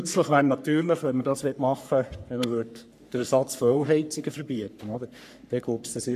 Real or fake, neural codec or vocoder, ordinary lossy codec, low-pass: fake; codec, 44.1 kHz, 3.4 kbps, Pupu-Codec; none; 14.4 kHz